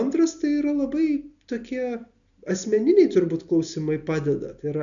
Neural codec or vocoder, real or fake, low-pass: none; real; 7.2 kHz